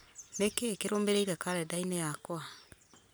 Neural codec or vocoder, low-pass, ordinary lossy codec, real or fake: none; none; none; real